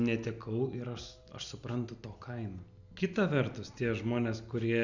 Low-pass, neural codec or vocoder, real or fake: 7.2 kHz; none; real